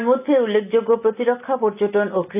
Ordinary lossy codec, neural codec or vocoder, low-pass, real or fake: none; none; 3.6 kHz; real